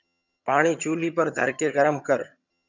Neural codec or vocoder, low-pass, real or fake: vocoder, 22.05 kHz, 80 mel bands, HiFi-GAN; 7.2 kHz; fake